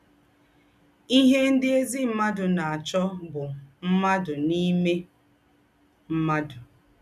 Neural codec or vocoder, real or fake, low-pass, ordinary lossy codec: none; real; 14.4 kHz; none